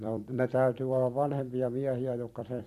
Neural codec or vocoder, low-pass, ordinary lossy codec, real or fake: vocoder, 44.1 kHz, 128 mel bands every 256 samples, BigVGAN v2; 14.4 kHz; none; fake